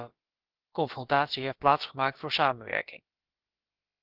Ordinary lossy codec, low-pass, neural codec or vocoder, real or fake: Opus, 16 kbps; 5.4 kHz; codec, 16 kHz, about 1 kbps, DyCAST, with the encoder's durations; fake